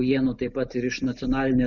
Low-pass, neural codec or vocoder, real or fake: 7.2 kHz; none; real